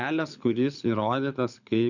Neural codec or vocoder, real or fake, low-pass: codec, 24 kHz, 6 kbps, HILCodec; fake; 7.2 kHz